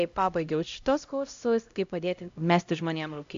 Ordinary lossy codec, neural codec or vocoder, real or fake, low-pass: AAC, 64 kbps; codec, 16 kHz, 0.5 kbps, X-Codec, HuBERT features, trained on LibriSpeech; fake; 7.2 kHz